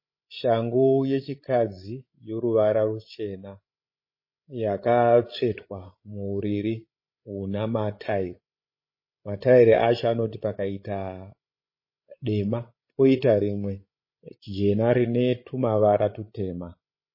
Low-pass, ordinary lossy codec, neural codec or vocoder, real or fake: 5.4 kHz; MP3, 24 kbps; codec, 16 kHz, 16 kbps, FreqCodec, larger model; fake